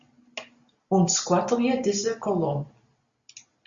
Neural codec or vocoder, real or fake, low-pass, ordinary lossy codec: none; real; 7.2 kHz; Opus, 64 kbps